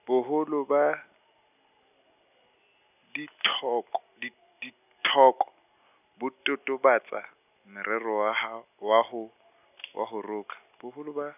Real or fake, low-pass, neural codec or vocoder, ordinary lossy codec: real; 3.6 kHz; none; none